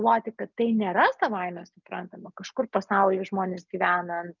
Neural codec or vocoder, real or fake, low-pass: none; real; 7.2 kHz